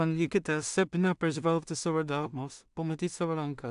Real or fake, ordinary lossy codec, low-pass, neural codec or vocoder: fake; AAC, 96 kbps; 10.8 kHz; codec, 16 kHz in and 24 kHz out, 0.4 kbps, LongCat-Audio-Codec, two codebook decoder